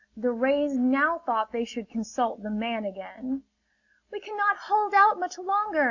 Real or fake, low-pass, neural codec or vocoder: real; 7.2 kHz; none